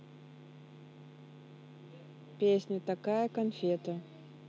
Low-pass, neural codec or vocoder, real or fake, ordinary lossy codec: none; none; real; none